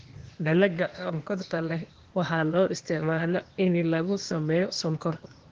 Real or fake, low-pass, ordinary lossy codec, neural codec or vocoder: fake; 7.2 kHz; Opus, 16 kbps; codec, 16 kHz, 0.8 kbps, ZipCodec